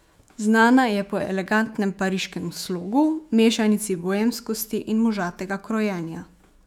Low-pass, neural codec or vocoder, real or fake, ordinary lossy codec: 19.8 kHz; autoencoder, 48 kHz, 128 numbers a frame, DAC-VAE, trained on Japanese speech; fake; none